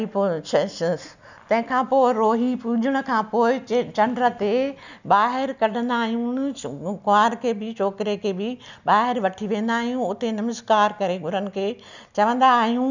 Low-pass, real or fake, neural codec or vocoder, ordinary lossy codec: 7.2 kHz; real; none; none